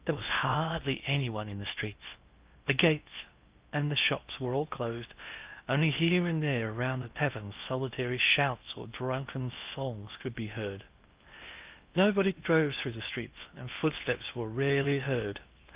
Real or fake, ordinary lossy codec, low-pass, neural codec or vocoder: fake; Opus, 24 kbps; 3.6 kHz; codec, 16 kHz in and 24 kHz out, 0.6 kbps, FocalCodec, streaming, 4096 codes